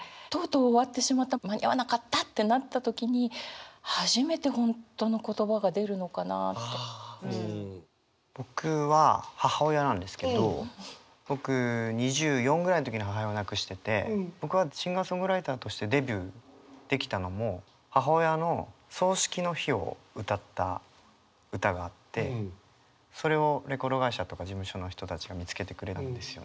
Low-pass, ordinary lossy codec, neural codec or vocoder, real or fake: none; none; none; real